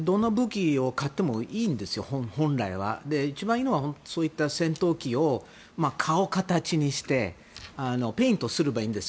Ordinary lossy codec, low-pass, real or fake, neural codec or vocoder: none; none; real; none